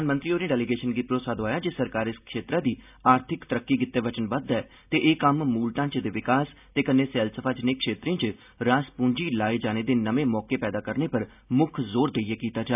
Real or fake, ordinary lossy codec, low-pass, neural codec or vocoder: real; none; 3.6 kHz; none